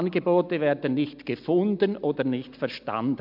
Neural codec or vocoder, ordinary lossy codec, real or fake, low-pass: none; MP3, 48 kbps; real; 5.4 kHz